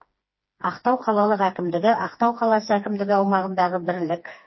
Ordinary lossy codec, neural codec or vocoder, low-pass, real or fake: MP3, 24 kbps; codec, 16 kHz, 2 kbps, FreqCodec, smaller model; 7.2 kHz; fake